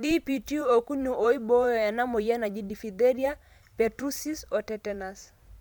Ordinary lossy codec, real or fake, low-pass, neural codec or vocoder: none; fake; 19.8 kHz; vocoder, 44.1 kHz, 128 mel bands every 512 samples, BigVGAN v2